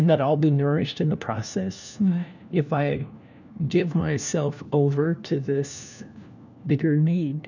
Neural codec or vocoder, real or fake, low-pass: codec, 16 kHz, 1 kbps, FunCodec, trained on LibriTTS, 50 frames a second; fake; 7.2 kHz